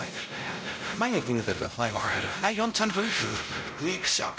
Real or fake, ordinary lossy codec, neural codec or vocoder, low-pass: fake; none; codec, 16 kHz, 0.5 kbps, X-Codec, WavLM features, trained on Multilingual LibriSpeech; none